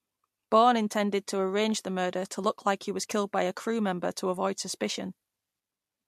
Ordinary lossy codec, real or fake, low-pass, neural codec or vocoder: MP3, 64 kbps; real; 14.4 kHz; none